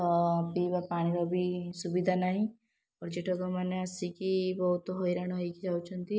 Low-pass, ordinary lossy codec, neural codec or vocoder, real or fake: none; none; none; real